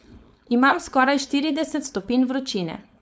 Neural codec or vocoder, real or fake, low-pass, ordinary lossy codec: codec, 16 kHz, 4.8 kbps, FACodec; fake; none; none